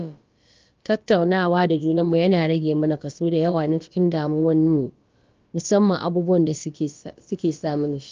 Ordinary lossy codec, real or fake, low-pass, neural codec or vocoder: Opus, 24 kbps; fake; 7.2 kHz; codec, 16 kHz, about 1 kbps, DyCAST, with the encoder's durations